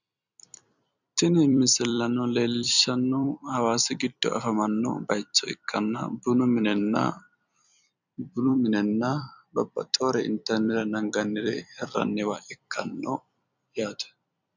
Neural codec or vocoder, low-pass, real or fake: vocoder, 44.1 kHz, 128 mel bands every 256 samples, BigVGAN v2; 7.2 kHz; fake